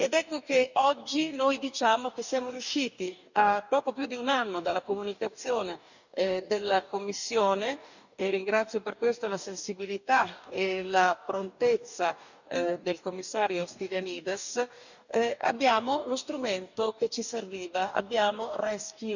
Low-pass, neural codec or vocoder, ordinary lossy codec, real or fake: 7.2 kHz; codec, 44.1 kHz, 2.6 kbps, DAC; none; fake